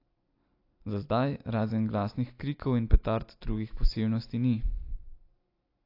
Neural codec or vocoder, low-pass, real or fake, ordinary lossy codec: none; 5.4 kHz; real; AAC, 32 kbps